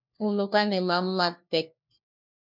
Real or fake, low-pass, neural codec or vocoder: fake; 5.4 kHz; codec, 16 kHz, 1 kbps, FunCodec, trained on LibriTTS, 50 frames a second